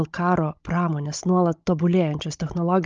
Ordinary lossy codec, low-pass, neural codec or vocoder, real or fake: Opus, 24 kbps; 7.2 kHz; codec, 16 kHz, 16 kbps, FunCodec, trained on Chinese and English, 50 frames a second; fake